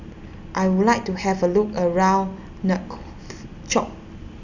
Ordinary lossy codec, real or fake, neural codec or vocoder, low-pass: none; real; none; 7.2 kHz